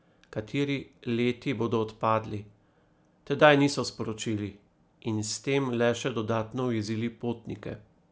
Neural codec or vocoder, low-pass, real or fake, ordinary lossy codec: none; none; real; none